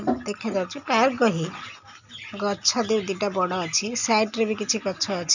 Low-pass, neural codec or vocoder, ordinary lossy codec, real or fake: 7.2 kHz; none; none; real